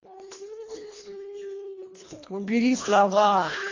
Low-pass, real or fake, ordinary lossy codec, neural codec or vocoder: 7.2 kHz; fake; AAC, 32 kbps; codec, 24 kHz, 1.5 kbps, HILCodec